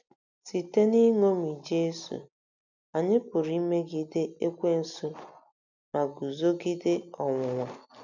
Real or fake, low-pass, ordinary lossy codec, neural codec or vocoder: real; 7.2 kHz; none; none